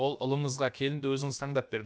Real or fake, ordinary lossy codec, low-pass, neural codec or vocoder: fake; none; none; codec, 16 kHz, about 1 kbps, DyCAST, with the encoder's durations